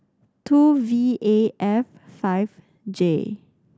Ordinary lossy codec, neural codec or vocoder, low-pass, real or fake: none; none; none; real